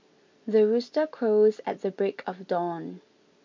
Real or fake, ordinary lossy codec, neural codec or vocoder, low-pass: real; MP3, 48 kbps; none; 7.2 kHz